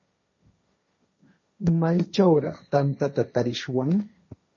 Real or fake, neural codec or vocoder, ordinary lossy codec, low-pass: fake; codec, 16 kHz, 1.1 kbps, Voila-Tokenizer; MP3, 32 kbps; 7.2 kHz